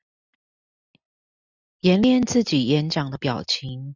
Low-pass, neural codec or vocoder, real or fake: 7.2 kHz; none; real